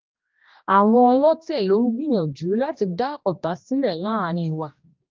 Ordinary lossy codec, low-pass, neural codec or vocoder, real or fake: Opus, 32 kbps; 7.2 kHz; codec, 16 kHz, 1 kbps, X-Codec, HuBERT features, trained on general audio; fake